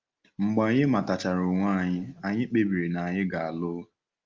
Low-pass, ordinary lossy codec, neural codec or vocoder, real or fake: 7.2 kHz; Opus, 24 kbps; none; real